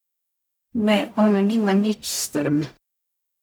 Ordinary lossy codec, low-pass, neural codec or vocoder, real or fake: none; none; codec, 44.1 kHz, 0.9 kbps, DAC; fake